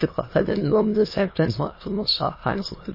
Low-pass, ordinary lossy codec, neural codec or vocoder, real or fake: 5.4 kHz; MP3, 24 kbps; autoencoder, 22.05 kHz, a latent of 192 numbers a frame, VITS, trained on many speakers; fake